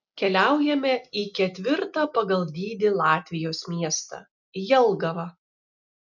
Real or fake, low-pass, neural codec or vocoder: real; 7.2 kHz; none